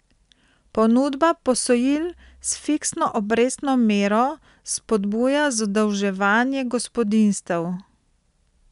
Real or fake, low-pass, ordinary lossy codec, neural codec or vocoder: real; 10.8 kHz; none; none